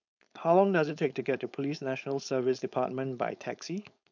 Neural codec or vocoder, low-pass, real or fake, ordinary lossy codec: codec, 16 kHz, 4.8 kbps, FACodec; 7.2 kHz; fake; none